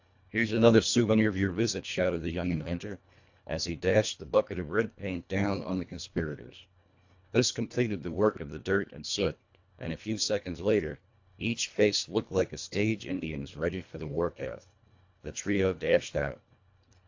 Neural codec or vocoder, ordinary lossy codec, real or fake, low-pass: codec, 24 kHz, 1.5 kbps, HILCodec; AAC, 48 kbps; fake; 7.2 kHz